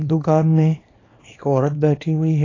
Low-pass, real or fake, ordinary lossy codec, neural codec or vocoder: 7.2 kHz; fake; AAC, 32 kbps; codec, 24 kHz, 0.9 kbps, WavTokenizer, small release